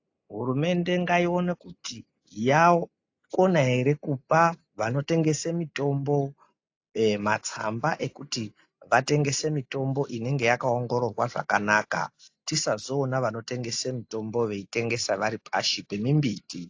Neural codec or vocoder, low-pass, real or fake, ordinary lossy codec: none; 7.2 kHz; real; AAC, 48 kbps